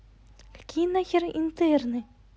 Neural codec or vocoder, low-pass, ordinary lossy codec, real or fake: none; none; none; real